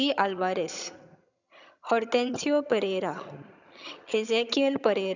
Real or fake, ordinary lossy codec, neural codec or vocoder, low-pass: fake; none; vocoder, 22.05 kHz, 80 mel bands, HiFi-GAN; 7.2 kHz